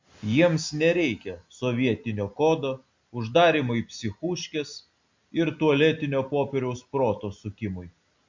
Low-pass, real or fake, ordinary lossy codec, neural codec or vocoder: 7.2 kHz; real; MP3, 64 kbps; none